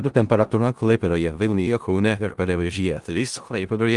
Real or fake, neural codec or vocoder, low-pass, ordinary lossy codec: fake; codec, 16 kHz in and 24 kHz out, 0.4 kbps, LongCat-Audio-Codec, four codebook decoder; 10.8 kHz; Opus, 24 kbps